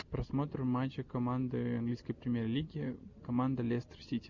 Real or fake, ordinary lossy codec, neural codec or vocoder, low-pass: real; Opus, 64 kbps; none; 7.2 kHz